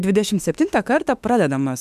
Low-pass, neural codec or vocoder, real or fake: 14.4 kHz; autoencoder, 48 kHz, 32 numbers a frame, DAC-VAE, trained on Japanese speech; fake